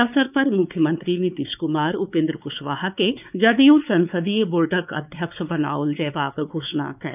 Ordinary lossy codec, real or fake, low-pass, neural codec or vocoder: none; fake; 3.6 kHz; codec, 16 kHz, 4 kbps, X-Codec, WavLM features, trained on Multilingual LibriSpeech